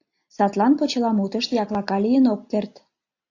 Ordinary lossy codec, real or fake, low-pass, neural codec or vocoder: AAC, 48 kbps; real; 7.2 kHz; none